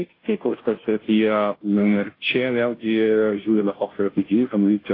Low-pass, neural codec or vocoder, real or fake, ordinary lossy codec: 5.4 kHz; codec, 16 kHz, 0.5 kbps, FunCodec, trained on Chinese and English, 25 frames a second; fake; AAC, 24 kbps